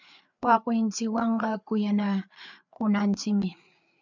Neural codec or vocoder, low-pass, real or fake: codec, 16 kHz, 4 kbps, FreqCodec, larger model; 7.2 kHz; fake